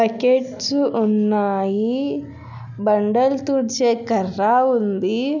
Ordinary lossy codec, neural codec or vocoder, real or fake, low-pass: none; none; real; 7.2 kHz